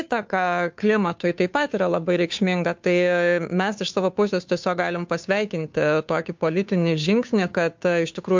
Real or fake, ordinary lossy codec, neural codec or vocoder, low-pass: fake; MP3, 64 kbps; codec, 16 kHz, 2 kbps, FunCodec, trained on Chinese and English, 25 frames a second; 7.2 kHz